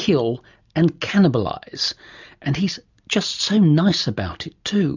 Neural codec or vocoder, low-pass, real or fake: none; 7.2 kHz; real